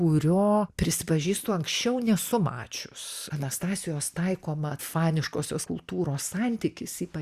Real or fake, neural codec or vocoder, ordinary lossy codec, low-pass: real; none; Opus, 64 kbps; 14.4 kHz